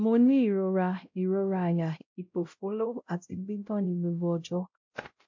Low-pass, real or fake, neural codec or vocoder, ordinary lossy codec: 7.2 kHz; fake; codec, 16 kHz, 0.5 kbps, X-Codec, WavLM features, trained on Multilingual LibriSpeech; MP3, 48 kbps